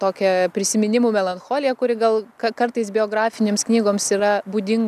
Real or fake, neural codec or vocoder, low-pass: real; none; 14.4 kHz